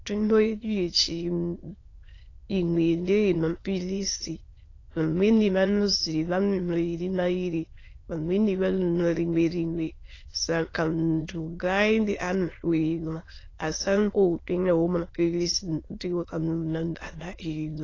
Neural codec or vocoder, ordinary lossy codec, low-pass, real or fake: autoencoder, 22.05 kHz, a latent of 192 numbers a frame, VITS, trained on many speakers; AAC, 32 kbps; 7.2 kHz; fake